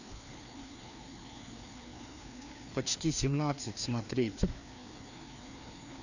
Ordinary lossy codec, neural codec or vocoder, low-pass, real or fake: none; codec, 16 kHz, 2 kbps, FreqCodec, larger model; 7.2 kHz; fake